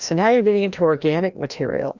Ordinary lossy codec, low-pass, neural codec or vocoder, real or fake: Opus, 64 kbps; 7.2 kHz; codec, 16 kHz, 1 kbps, FreqCodec, larger model; fake